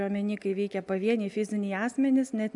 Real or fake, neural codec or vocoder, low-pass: real; none; 10.8 kHz